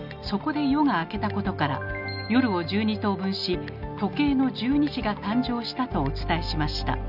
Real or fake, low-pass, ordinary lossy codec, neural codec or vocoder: real; 5.4 kHz; none; none